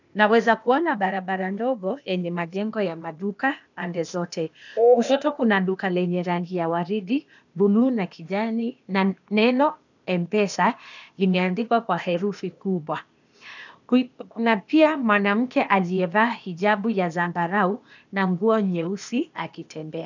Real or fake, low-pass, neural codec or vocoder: fake; 7.2 kHz; codec, 16 kHz, 0.8 kbps, ZipCodec